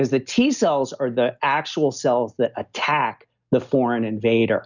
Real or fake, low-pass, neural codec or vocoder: real; 7.2 kHz; none